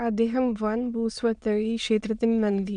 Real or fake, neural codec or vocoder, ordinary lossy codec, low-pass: fake; autoencoder, 22.05 kHz, a latent of 192 numbers a frame, VITS, trained on many speakers; none; 9.9 kHz